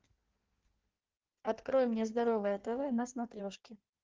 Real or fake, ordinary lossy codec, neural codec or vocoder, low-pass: fake; Opus, 16 kbps; codec, 16 kHz in and 24 kHz out, 1.1 kbps, FireRedTTS-2 codec; 7.2 kHz